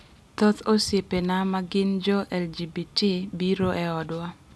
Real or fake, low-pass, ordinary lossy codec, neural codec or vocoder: real; none; none; none